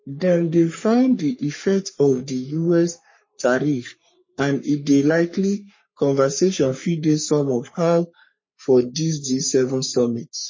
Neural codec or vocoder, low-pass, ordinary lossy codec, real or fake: codec, 44.1 kHz, 3.4 kbps, Pupu-Codec; 7.2 kHz; MP3, 32 kbps; fake